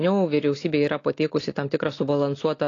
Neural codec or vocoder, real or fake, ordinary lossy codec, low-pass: none; real; AAC, 32 kbps; 7.2 kHz